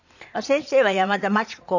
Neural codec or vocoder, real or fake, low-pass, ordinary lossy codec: vocoder, 22.05 kHz, 80 mel bands, Vocos; fake; 7.2 kHz; none